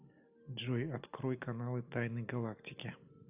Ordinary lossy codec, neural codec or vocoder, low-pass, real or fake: AAC, 32 kbps; none; 3.6 kHz; real